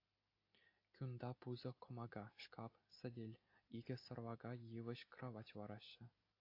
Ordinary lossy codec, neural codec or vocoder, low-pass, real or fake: AAC, 32 kbps; none; 5.4 kHz; real